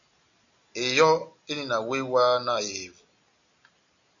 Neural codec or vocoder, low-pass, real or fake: none; 7.2 kHz; real